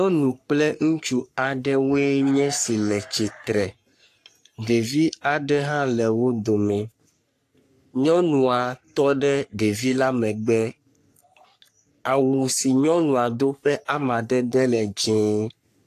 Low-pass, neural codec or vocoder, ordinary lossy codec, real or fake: 14.4 kHz; codec, 44.1 kHz, 3.4 kbps, Pupu-Codec; AAC, 64 kbps; fake